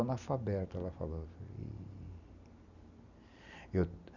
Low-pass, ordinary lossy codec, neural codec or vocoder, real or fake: 7.2 kHz; none; none; real